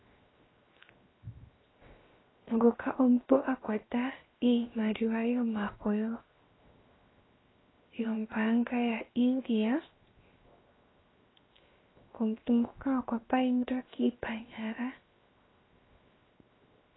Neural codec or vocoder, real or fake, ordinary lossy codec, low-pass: codec, 16 kHz, 0.7 kbps, FocalCodec; fake; AAC, 16 kbps; 7.2 kHz